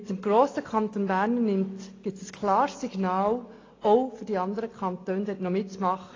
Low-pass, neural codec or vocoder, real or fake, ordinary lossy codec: 7.2 kHz; none; real; AAC, 32 kbps